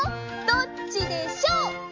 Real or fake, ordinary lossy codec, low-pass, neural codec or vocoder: real; MP3, 48 kbps; 7.2 kHz; none